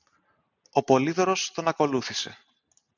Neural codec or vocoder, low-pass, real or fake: none; 7.2 kHz; real